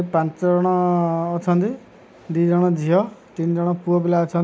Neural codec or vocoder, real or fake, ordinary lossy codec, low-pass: none; real; none; none